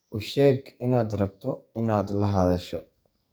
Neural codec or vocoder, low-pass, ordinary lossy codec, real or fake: codec, 44.1 kHz, 2.6 kbps, SNAC; none; none; fake